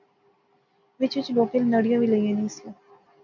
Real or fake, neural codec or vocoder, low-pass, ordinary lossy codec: real; none; 7.2 kHz; MP3, 48 kbps